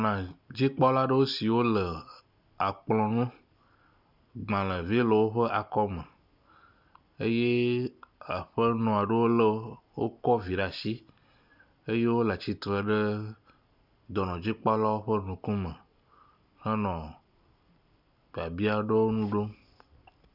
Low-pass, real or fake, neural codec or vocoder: 5.4 kHz; real; none